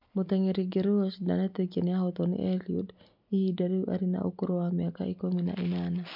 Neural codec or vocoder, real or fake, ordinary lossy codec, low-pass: none; real; MP3, 48 kbps; 5.4 kHz